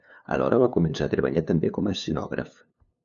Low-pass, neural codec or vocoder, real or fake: 7.2 kHz; codec, 16 kHz, 2 kbps, FunCodec, trained on LibriTTS, 25 frames a second; fake